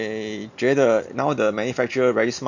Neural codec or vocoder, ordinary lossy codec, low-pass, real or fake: none; none; 7.2 kHz; real